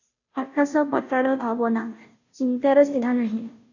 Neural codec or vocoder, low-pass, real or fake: codec, 16 kHz, 0.5 kbps, FunCodec, trained on Chinese and English, 25 frames a second; 7.2 kHz; fake